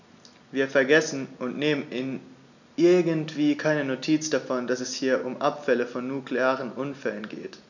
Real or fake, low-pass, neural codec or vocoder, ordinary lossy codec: real; 7.2 kHz; none; none